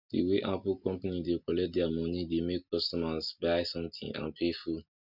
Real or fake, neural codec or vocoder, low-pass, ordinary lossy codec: real; none; 5.4 kHz; Opus, 64 kbps